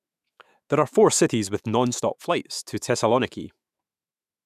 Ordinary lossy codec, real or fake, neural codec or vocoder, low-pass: none; fake; autoencoder, 48 kHz, 128 numbers a frame, DAC-VAE, trained on Japanese speech; 14.4 kHz